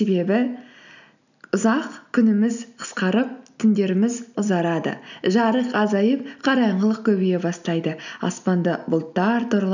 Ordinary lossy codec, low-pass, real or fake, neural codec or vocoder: none; 7.2 kHz; real; none